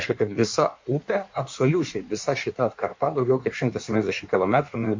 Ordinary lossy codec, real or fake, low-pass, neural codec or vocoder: MP3, 48 kbps; fake; 7.2 kHz; codec, 16 kHz in and 24 kHz out, 1.1 kbps, FireRedTTS-2 codec